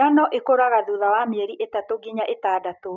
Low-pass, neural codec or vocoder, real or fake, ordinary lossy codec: 7.2 kHz; none; real; none